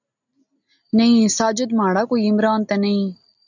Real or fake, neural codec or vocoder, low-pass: real; none; 7.2 kHz